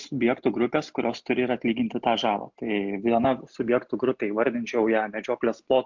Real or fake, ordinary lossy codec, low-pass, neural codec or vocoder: real; MP3, 64 kbps; 7.2 kHz; none